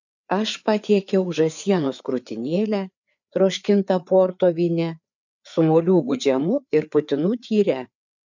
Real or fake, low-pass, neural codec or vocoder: fake; 7.2 kHz; codec, 16 kHz, 4 kbps, FreqCodec, larger model